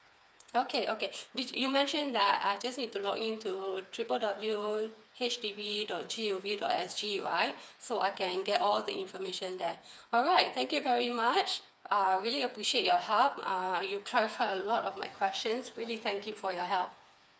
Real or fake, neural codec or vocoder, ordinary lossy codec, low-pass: fake; codec, 16 kHz, 4 kbps, FreqCodec, smaller model; none; none